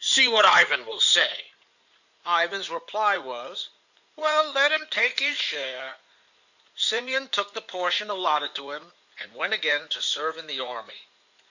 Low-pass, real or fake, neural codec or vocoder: 7.2 kHz; fake; codec, 16 kHz in and 24 kHz out, 2.2 kbps, FireRedTTS-2 codec